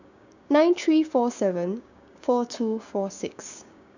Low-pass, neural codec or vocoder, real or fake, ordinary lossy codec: 7.2 kHz; codec, 16 kHz, 6 kbps, DAC; fake; none